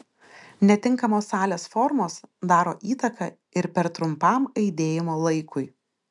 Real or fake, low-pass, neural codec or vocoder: real; 10.8 kHz; none